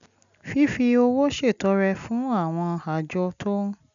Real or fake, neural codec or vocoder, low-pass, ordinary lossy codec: real; none; 7.2 kHz; none